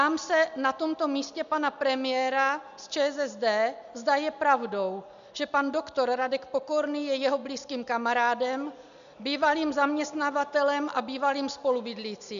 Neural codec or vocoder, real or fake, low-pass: none; real; 7.2 kHz